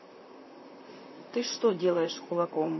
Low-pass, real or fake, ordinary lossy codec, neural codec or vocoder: 7.2 kHz; fake; MP3, 24 kbps; vocoder, 44.1 kHz, 128 mel bands, Pupu-Vocoder